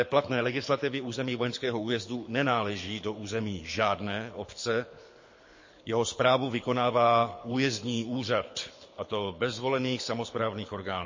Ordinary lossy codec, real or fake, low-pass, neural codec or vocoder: MP3, 32 kbps; fake; 7.2 kHz; codec, 24 kHz, 6 kbps, HILCodec